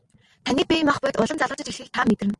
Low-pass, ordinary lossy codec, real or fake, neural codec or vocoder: 10.8 kHz; Opus, 32 kbps; real; none